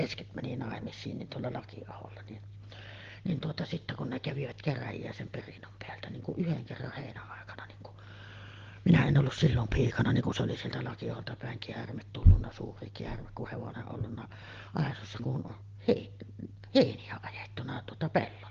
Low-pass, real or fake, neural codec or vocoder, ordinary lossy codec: 7.2 kHz; real; none; Opus, 16 kbps